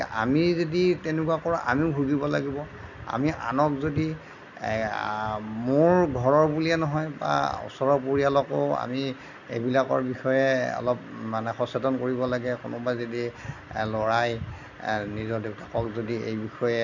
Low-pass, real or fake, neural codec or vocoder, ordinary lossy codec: 7.2 kHz; real; none; none